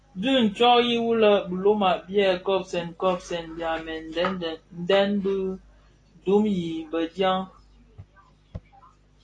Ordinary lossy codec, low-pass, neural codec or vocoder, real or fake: AAC, 32 kbps; 9.9 kHz; none; real